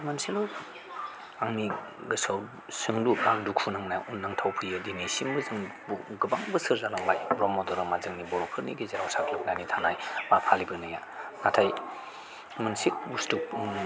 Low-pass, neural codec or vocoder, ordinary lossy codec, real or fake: none; none; none; real